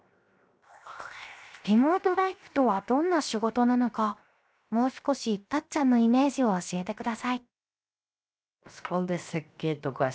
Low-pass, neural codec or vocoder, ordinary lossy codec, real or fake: none; codec, 16 kHz, 0.7 kbps, FocalCodec; none; fake